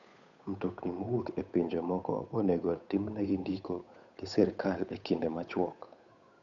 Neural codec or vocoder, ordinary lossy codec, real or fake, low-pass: codec, 16 kHz, 8 kbps, FunCodec, trained on Chinese and English, 25 frames a second; none; fake; 7.2 kHz